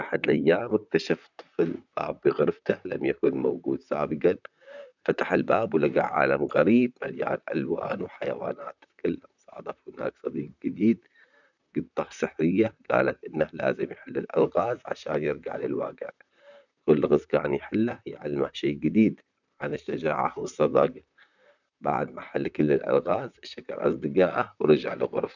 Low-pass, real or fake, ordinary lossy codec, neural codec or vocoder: 7.2 kHz; fake; none; vocoder, 44.1 kHz, 128 mel bands, Pupu-Vocoder